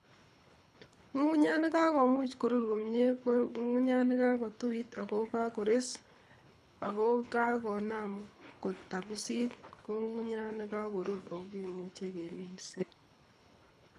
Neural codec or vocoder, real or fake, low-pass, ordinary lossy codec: codec, 24 kHz, 3 kbps, HILCodec; fake; none; none